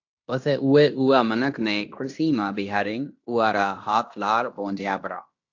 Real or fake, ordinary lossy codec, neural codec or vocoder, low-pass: fake; AAC, 48 kbps; codec, 16 kHz in and 24 kHz out, 0.9 kbps, LongCat-Audio-Codec, fine tuned four codebook decoder; 7.2 kHz